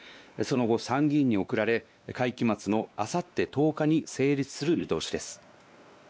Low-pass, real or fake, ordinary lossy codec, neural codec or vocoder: none; fake; none; codec, 16 kHz, 2 kbps, X-Codec, WavLM features, trained on Multilingual LibriSpeech